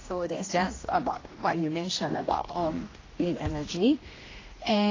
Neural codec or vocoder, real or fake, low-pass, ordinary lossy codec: codec, 16 kHz, 1 kbps, X-Codec, HuBERT features, trained on general audio; fake; 7.2 kHz; AAC, 32 kbps